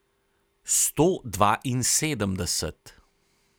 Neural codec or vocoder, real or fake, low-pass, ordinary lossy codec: none; real; none; none